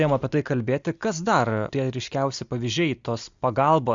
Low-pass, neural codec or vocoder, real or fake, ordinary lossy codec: 7.2 kHz; none; real; Opus, 64 kbps